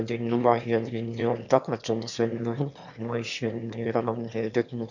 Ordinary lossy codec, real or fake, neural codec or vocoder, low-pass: none; fake; autoencoder, 22.05 kHz, a latent of 192 numbers a frame, VITS, trained on one speaker; 7.2 kHz